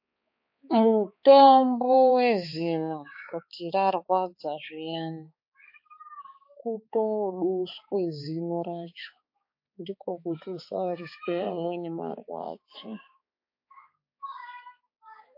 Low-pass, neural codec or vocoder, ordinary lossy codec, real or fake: 5.4 kHz; codec, 16 kHz, 4 kbps, X-Codec, HuBERT features, trained on balanced general audio; MP3, 32 kbps; fake